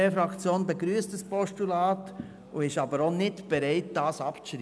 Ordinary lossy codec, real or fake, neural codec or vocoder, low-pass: none; real; none; none